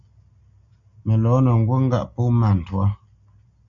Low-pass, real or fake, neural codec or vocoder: 7.2 kHz; real; none